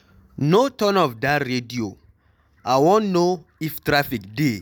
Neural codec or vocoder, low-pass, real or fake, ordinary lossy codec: none; none; real; none